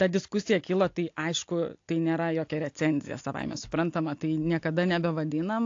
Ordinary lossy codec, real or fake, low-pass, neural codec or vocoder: AAC, 48 kbps; real; 7.2 kHz; none